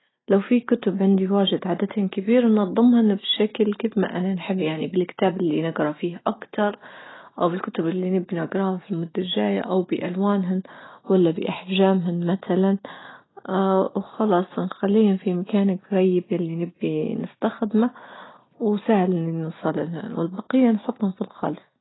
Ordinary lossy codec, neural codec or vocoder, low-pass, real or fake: AAC, 16 kbps; none; 7.2 kHz; real